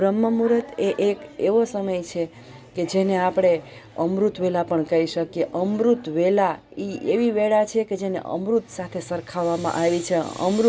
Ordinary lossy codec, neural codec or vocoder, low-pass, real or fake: none; none; none; real